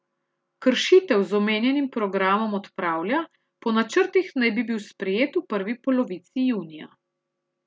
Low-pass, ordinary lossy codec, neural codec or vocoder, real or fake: none; none; none; real